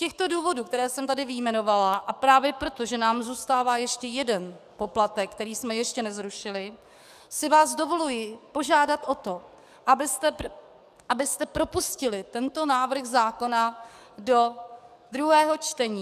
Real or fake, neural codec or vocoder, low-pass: fake; codec, 44.1 kHz, 7.8 kbps, DAC; 14.4 kHz